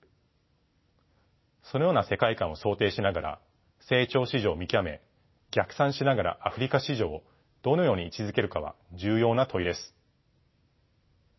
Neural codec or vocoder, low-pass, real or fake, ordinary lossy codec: none; 7.2 kHz; real; MP3, 24 kbps